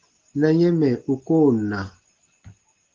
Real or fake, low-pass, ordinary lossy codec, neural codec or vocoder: real; 7.2 kHz; Opus, 16 kbps; none